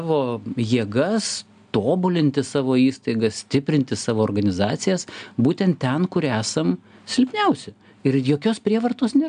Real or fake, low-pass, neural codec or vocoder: real; 9.9 kHz; none